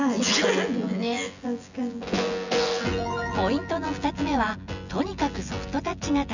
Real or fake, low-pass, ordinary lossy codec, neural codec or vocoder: fake; 7.2 kHz; none; vocoder, 24 kHz, 100 mel bands, Vocos